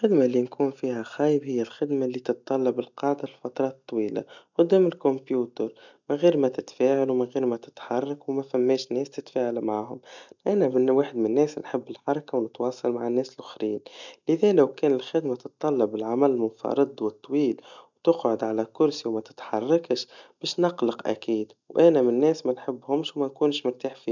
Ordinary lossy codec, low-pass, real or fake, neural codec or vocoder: none; 7.2 kHz; real; none